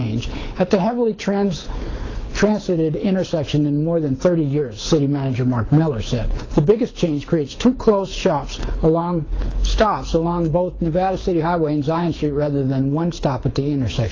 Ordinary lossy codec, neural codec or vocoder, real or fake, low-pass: AAC, 32 kbps; codec, 24 kHz, 6 kbps, HILCodec; fake; 7.2 kHz